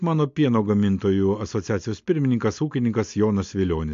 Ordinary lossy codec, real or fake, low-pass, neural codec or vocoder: MP3, 48 kbps; real; 7.2 kHz; none